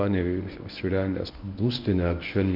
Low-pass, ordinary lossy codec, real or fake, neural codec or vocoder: 5.4 kHz; MP3, 48 kbps; fake; codec, 24 kHz, 0.9 kbps, WavTokenizer, medium speech release version 1